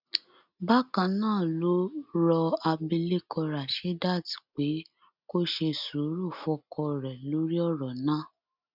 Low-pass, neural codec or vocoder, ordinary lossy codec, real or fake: 5.4 kHz; none; none; real